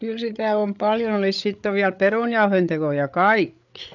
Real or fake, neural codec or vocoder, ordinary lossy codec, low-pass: fake; codec, 16 kHz, 8 kbps, FreqCodec, larger model; none; 7.2 kHz